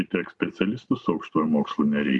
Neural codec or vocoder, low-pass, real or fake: none; 10.8 kHz; real